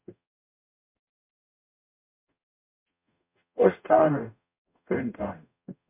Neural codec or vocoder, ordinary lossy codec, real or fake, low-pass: codec, 44.1 kHz, 0.9 kbps, DAC; MP3, 32 kbps; fake; 3.6 kHz